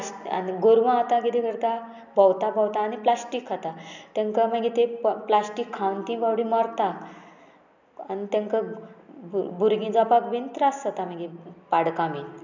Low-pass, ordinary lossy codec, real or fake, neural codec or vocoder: 7.2 kHz; none; real; none